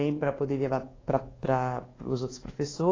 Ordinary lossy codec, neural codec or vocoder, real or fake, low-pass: AAC, 32 kbps; codec, 24 kHz, 1.2 kbps, DualCodec; fake; 7.2 kHz